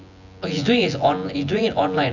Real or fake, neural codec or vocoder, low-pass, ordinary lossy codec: fake; vocoder, 24 kHz, 100 mel bands, Vocos; 7.2 kHz; none